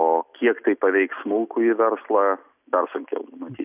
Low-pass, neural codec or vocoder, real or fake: 3.6 kHz; none; real